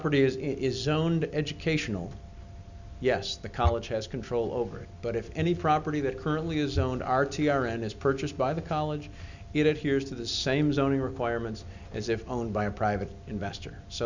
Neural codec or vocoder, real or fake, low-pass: none; real; 7.2 kHz